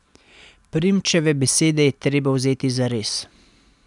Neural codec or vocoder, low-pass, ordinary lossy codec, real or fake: none; 10.8 kHz; none; real